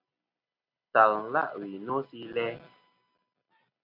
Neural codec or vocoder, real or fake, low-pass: none; real; 5.4 kHz